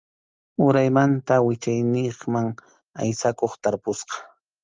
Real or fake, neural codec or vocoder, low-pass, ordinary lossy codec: real; none; 7.2 kHz; Opus, 32 kbps